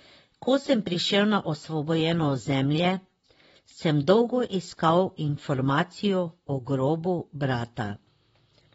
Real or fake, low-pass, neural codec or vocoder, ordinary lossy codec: fake; 19.8 kHz; vocoder, 44.1 kHz, 128 mel bands, Pupu-Vocoder; AAC, 24 kbps